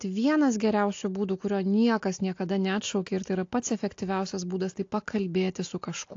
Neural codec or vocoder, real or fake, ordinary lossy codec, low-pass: none; real; AAC, 48 kbps; 7.2 kHz